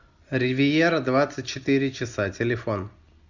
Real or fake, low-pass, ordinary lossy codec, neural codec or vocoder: real; 7.2 kHz; Opus, 64 kbps; none